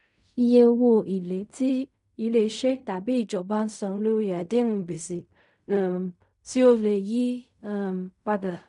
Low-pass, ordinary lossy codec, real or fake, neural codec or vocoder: 10.8 kHz; none; fake; codec, 16 kHz in and 24 kHz out, 0.4 kbps, LongCat-Audio-Codec, fine tuned four codebook decoder